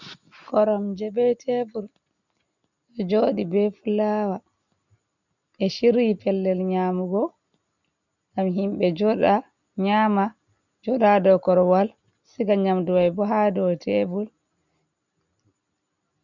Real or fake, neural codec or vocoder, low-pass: real; none; 7.2 kHz